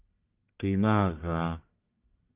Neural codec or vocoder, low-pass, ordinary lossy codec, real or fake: codec, 44.1 kHz, 1.7 kbps, Pupu-Codec; 3.6 kHz; Opus, 24 kbps; fake